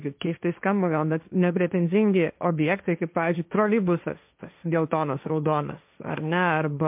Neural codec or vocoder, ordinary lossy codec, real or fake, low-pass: codec, 16 kHz, 1.1 kbps, Voila-Tokenizer; MP3, 32 kbps; fake; 3.6 kHz